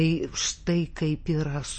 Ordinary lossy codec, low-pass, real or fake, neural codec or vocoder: MP3, 32 kbps; 9.9 kHz; real; none